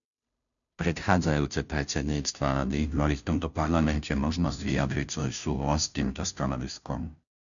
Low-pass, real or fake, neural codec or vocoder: 7.2 kHz; fake; codec, 16 kHz, 0.5 kbps, FunCodec, trained on Chinese and English, 25 frames a second